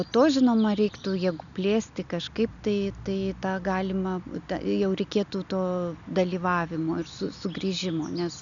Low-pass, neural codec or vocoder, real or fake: 7.2 kHz; none; real